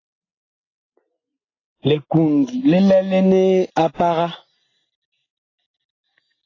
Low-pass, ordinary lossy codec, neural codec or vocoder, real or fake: 7.2 kHz; AAC, 32 kbps; none; real